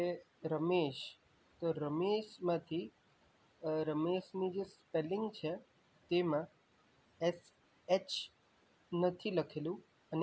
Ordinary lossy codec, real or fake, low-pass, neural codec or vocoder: none; real; none; none